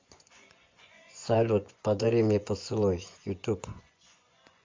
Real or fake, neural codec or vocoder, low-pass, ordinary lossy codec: fake; vocoder, 24 kHz, 100 mel bands, Vocos; 7.2 kHz; MP3, 64 kbps